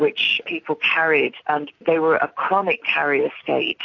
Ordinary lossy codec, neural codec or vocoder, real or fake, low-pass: AAC, 48 kbps; none; real; 7.2 kHz